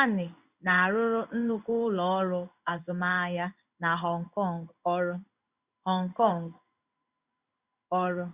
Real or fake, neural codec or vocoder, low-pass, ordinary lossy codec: fake; codec, 16 kHz in and 24 kHz out, 1 kbps, XY-Tokenizer; 3.6 kHz; Opus, 64 kbps